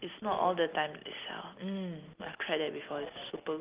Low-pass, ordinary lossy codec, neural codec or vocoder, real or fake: 3.6 kHz; Opus, 32 kbps; none; real